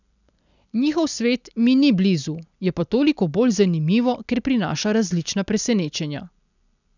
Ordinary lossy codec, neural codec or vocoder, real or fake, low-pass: none; none; real; 7.2 kHz